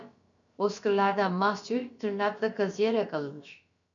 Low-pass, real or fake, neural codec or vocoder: 7.2 kHz; fake; codec, 16 kHz, about 1 kbps, DyCAST, with the encoder's durations